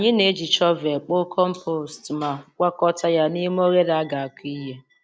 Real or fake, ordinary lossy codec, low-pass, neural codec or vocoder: real; none; none; none